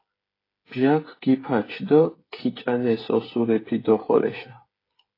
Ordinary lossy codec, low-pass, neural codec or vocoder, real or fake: AAC, 24 kbps; 5.4 kHz; codec, 16 kHz, 8 kbps, FreqCodec, smaller model; fake